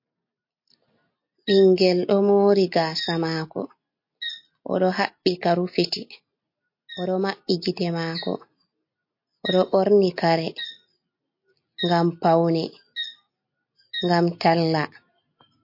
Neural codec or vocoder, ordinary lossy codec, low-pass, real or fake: none; MP3, 32 kbps; 5.4 kHz; real